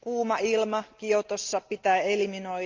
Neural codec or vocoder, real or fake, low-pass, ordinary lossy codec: none; real; 7.2 kHz; Opus, 24 kbps